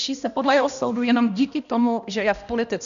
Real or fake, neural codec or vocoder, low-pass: fake; codec, 16 kHz, 1 kbps, X-Codec, HuBERT features, trained on balanced general audio; 7.2 kHz